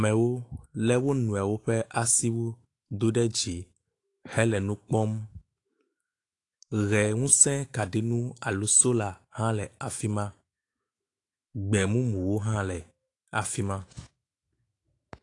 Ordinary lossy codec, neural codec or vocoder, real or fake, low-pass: AAC, 48 kbps; autoencoder, 48 kHz, 128 numbers a frame, DAC-VAE, trained on Japanese speech; fake; 10.8 kHz